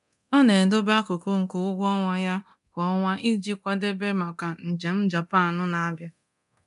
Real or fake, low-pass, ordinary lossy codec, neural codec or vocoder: fake; 10.8 kHz; none; codec, 24 kHz, 0.9 kbps, DualCodec